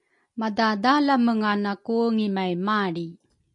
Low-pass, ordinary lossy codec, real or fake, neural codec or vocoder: 10.8 kHz; MP3, 48 kbps; real; none